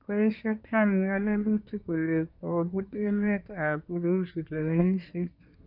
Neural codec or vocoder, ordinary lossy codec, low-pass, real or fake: codec, 24 kHz, 1 kbps, SNAC; none; 5.4 kHz; fake